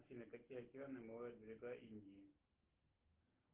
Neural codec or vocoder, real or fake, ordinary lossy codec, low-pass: none; real; Opus, 16 kbps; 3.6 kHz